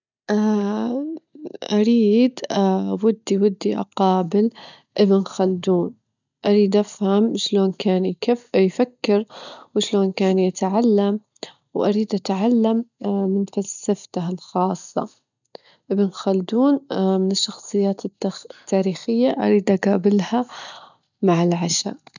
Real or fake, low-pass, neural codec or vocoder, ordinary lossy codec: real; 7.2 kHz; none; none